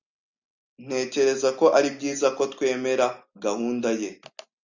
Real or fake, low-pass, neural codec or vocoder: real; 7.2 kHz; none